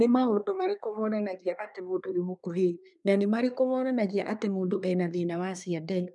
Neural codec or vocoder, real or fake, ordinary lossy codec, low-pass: codec, 24 kHz, 1 kbps, SNAC; fake; none; 10.8 kHz